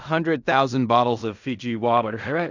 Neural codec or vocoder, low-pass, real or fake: codec, 16 kHz in and 24 kHz out, 0.4 kbps, LongCat-Audio-Codec, fine tuned four codebook decoder; 7.2 kHz; fake